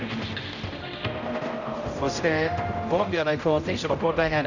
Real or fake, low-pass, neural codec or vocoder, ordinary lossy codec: fake; 7.2 kHz; codec, 16 kHz, 0.5 kbps, X-Codec, HuBERT features, trained on general audio; none